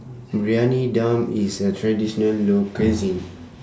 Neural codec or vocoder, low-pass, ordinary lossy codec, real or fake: none; none; none; real